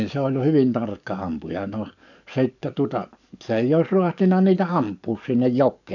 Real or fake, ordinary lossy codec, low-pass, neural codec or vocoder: fake; none; 7.2 kHz; codec, 44.1 kHz, 7.8 kbps, DAC